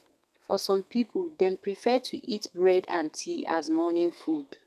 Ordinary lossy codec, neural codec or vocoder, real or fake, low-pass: none; codec, 32 kHz, 1.9 kbps, SNAC; fake; 14.4 kHz